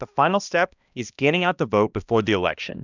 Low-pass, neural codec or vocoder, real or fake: 7.2 kHz; codec, 16 kHz, 1 kbps, X-Codec, HuBERT features, trained on balanced general audio; fake